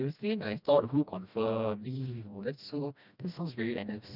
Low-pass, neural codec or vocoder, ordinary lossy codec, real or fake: 5.4 kHz; codec, 16 kHz, 1 kbps, FreqCodec, smaller model; none; fake